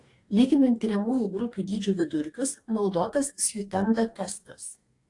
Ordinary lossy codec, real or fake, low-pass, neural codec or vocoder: AAC, 48 kbps; fake; 10.8 kHz; codec, 44.1 kHz, 2.6 kbps, DAC